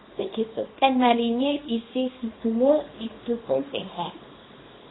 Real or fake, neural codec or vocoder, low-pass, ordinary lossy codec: fake; codec, 24 kHz, 0.9 kbps, WavTokenizer, small release; 7.2 kHz; AAC, 16 kbps